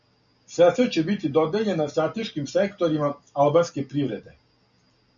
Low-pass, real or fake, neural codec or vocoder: 7.2 kHz; real; none